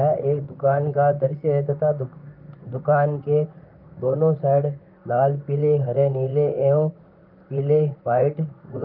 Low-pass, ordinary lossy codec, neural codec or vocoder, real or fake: 5.4 kHz; Opus, 24 kbps; vocoder, 44.1 kHz, 128 mel bands, Pupu-Vocoder; fake